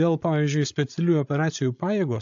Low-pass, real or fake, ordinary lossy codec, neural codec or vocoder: 7.2 kHz; fake; AAC, 64 kbps; codec, 16 kHz, 16 kbps, FreqCodec, larger model